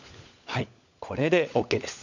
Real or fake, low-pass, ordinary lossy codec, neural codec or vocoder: fake; 7.2 kHz; none; codec, 16 kHz, 16 kbps, FunCodec, trained on LibriTTS, 50 frames a second